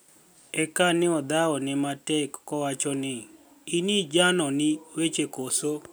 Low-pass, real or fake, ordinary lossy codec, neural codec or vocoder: none; real; none; none